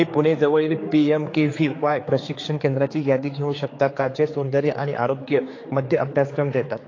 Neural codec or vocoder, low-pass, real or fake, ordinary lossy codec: codec, 16 kHz, 4 kbps, X-Codec, HuBERT features, trained on general audio; 7.2 kHz; fake; AAC, 32 kbps